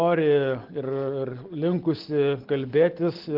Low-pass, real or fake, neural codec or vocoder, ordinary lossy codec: 5.4 kHz; real; none; Opus, 16 kbps